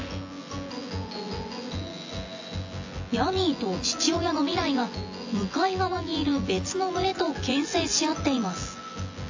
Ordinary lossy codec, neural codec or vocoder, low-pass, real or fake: AAC, 48 kbps; vocoder, 24 kHz, 100 mel bands, Vocos; 7.2 kHz; fake